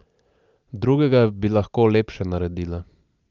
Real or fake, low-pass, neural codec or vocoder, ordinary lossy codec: real; 7.2 kHz; none; Opus, 24 kbps